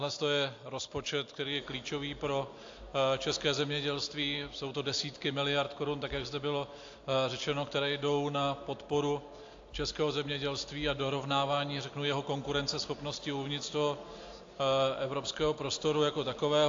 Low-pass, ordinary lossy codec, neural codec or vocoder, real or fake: 7.2 kHz; AAC, 48 kbps; none; real